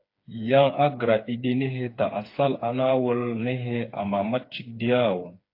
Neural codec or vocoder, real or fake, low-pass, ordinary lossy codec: codec, 16 kHz, 4 kbps, FreqCodec, smaller model; fake; 5.4 kHz; AAC, 32 kbps